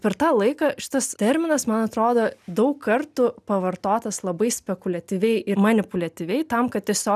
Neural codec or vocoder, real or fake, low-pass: none; real; 14.4 kHz